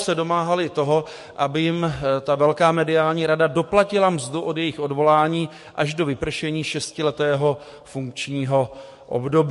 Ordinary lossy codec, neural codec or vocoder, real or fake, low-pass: MP3, 48 kbps; codec, 44.1 kHz, 7.8 kbps, DAC; fake; 14.4 kHz